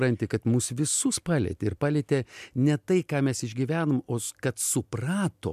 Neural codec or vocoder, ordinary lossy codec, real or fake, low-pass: none; MP3, 96 kbps; real; 14.4 kHz